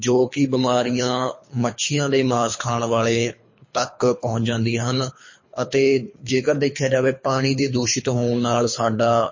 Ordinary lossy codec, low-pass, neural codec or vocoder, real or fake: MP3, 32 kbps; 7.2 kHz; codec, 24 kHz, 3 kbps, HILCodec; fake